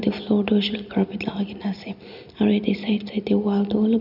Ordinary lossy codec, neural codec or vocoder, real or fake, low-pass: none; none; real; 5.4 kHz